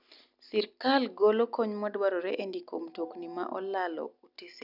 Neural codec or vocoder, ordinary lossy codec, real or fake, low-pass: none; none; real; 5.4 kHz